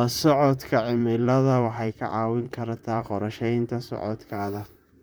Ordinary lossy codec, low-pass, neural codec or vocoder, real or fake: none; none; vocoder, 44.1 kHz, 128 mel bands, Pupu-Vocoder; fake